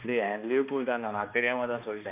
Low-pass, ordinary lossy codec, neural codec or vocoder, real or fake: 3.6 kHz; none; codec, 16 kHz, 2 kbps, X-Codec, HuBERT features, trained on balanced general audio; fake